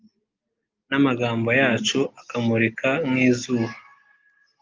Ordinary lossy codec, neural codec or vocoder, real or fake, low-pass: Opus, 24 kbps; none; real; 7.2 kHz